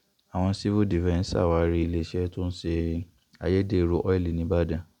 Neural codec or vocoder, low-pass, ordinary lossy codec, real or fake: none; 19.8 kHz; MP3, 96 kbps; real